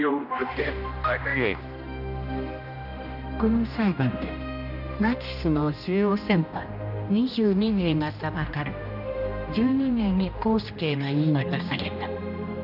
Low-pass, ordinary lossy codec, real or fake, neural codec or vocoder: 5.4 kHz; none; fake; codec, 16 kHz, 1 kbps, X-Codec, HuBERT features, trained on general audio